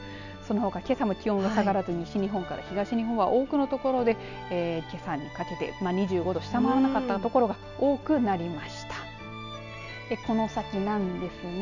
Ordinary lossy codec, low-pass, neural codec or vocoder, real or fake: none; 7.2 kHz; none; real